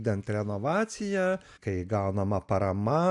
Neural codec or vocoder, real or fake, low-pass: none; real; 10.8 kHz